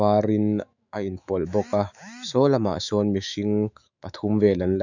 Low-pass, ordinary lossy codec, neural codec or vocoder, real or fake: 7.2 kHz; none; autoencoder, 48 kHz, 128 numbers a frame, DAC-VAE, trained on Japanese speech; fake